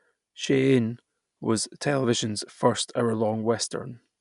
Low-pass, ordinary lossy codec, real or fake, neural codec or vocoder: 10.8 kHz; none; real; none